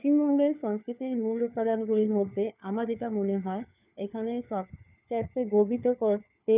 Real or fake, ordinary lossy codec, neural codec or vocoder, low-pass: fake; none; codec, 16 kHz, 4 kbps, FunCodec, trained on LibriTTS, 50 frames a second; 3.6 kHz